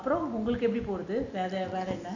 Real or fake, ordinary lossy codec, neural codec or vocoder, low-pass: real; none; none; 7.2 kHz